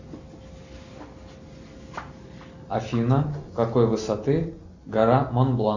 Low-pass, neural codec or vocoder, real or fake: 7.2 kHz; none; real